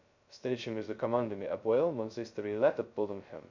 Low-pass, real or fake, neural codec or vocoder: 7.2 kHz; fake; codec, 16 kHz, 0.2 kbps, FocalCodec